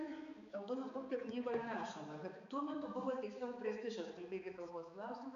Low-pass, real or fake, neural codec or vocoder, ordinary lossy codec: 7.2 kHz; fake; codec, 16 kHz, 4 kbps, X-Codec, HuBERT features, trained on balanced general audio; AAC, 64 kbps